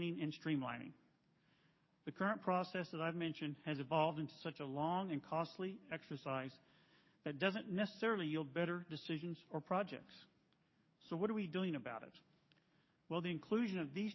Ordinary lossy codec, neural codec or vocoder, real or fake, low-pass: MP3, 24 kbps; codec, 44.1 kHz, 7.8 kbps, DAC; fake; 7.2 kHz